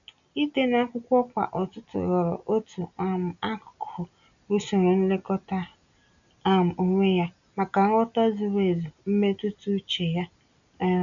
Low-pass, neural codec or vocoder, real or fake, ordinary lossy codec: 7.2 kHz; none; real; none